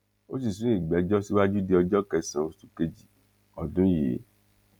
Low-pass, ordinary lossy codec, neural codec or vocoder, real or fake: 19.8 kHz; none; none; real